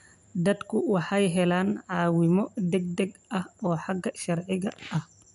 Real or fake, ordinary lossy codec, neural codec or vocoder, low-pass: real; none; none; 10.8 kHz